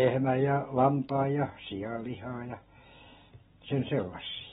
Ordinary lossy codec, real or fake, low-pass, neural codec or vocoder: AAC, 16 kbps; real; 19.8 kHz; none